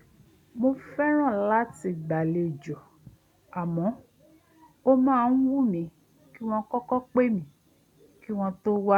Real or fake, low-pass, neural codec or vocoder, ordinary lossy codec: real; 19.8 kHz; none; none